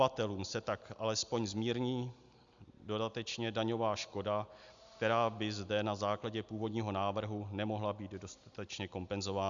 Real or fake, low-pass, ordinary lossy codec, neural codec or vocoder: real; 7.2 kHz; Opus, 64 kbps; none